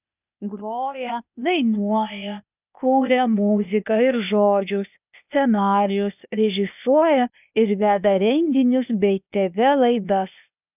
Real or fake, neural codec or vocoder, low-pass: fake; codec, 16 kHz, 0.8 kbps, ZipCodec; 3.6 kHz